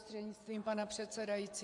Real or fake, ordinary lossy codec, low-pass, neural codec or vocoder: fake; MP3, 64 kbps; 10.8 kHz; vocoder, 24 kHz, 100 mel bands, Vocos